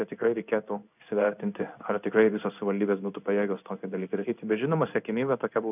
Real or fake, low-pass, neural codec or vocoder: fake; 3.6 kHz; codec, 16 kHz in and 24 kHz out, 1 kbps, XY-Tokenizer